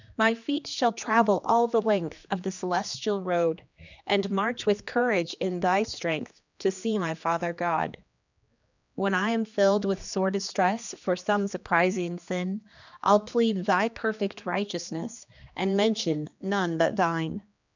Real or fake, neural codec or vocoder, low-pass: fake; codec, 16 kHz, 2 kbps, X-Codec, HuBERT features, trained on general audio; 7.2 kHz